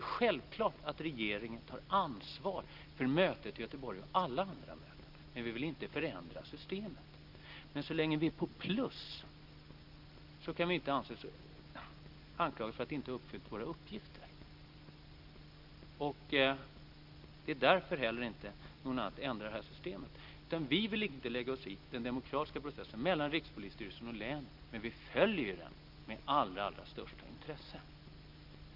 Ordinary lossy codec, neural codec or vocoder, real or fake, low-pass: Opus, 24 kbps; none; real; 5.4 kHz